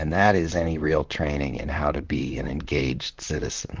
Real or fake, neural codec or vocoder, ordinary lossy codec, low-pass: fake; vocoder, 44.1 kHz, 128 mel bands, Pupu-Vocoder; Opus, 32 kbps; 7.2 kHz